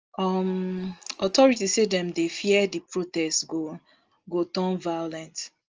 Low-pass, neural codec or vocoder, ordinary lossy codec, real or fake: 7.2 kHz; none; Opus, 24 kbps; real